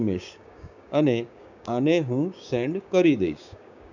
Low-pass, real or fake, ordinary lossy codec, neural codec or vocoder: 7.2 kHz; fake; none; codec, 16 kHz, 6 kbps, DAC